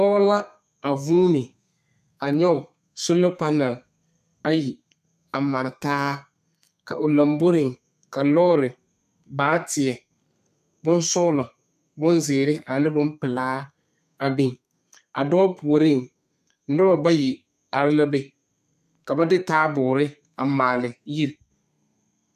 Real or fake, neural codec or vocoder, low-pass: fake; codec, 32 kHz, 1.9 kbps, SNAC; 14.4 kHz